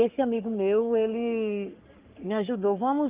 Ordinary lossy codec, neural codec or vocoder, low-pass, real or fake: Opus, 32 kbps; codec, 44.1 kHz, 3.4 kbps, Pupu-Codec; 3.6 kHz; fake